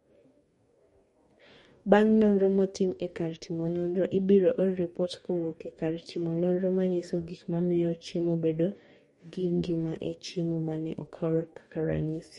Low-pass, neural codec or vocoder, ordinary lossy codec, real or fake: 19.8 kHz; codec, 44.1 kHz, 2.6 kbps, DAC; MP3, 48 kbps; fake